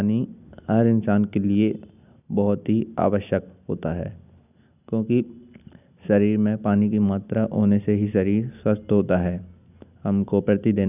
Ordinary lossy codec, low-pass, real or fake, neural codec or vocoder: none; 3.6 kHz; real; none